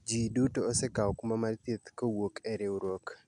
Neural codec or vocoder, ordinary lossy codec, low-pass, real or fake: none; none; 10.8 kHz; real